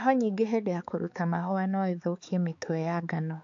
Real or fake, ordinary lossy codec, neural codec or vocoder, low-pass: fake; AAC, 64 kbps; codec, 16 kHz, 4 kbps, X-Codec, HuBERT features, trained on balanced general audio; 7.2 kHz